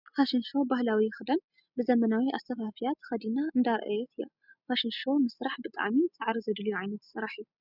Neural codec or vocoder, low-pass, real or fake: none; 5.4 kHz; real